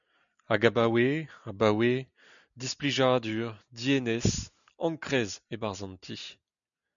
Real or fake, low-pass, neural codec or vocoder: real; 7.2 kHz; none